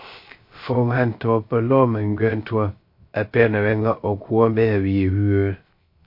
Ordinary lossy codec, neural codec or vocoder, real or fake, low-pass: MP3, 32 kbps; codec, 16 kHz, 0.3 kbps, FocalCodec; fake; 5.4 kHz